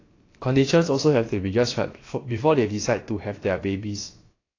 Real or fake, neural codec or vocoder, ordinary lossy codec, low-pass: fake; codec, 16 kHz, about 1 kbps, DyCAST, with the encoder's durations; AAC, 32 kbps; 7.2 kHz